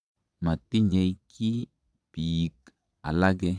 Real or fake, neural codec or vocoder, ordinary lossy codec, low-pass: fake; vocoder, 22.05 kHz, 80 mel bands, Vocos; none; none